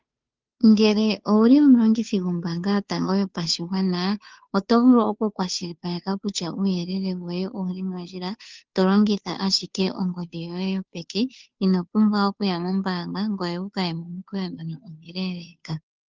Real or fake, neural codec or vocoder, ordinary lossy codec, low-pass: fake; codec, 16 kHz, 2 kbps, FunCodec, trained on Chinese and English, 25 frames a second; Opus, 32 kbps; 7.2 kHz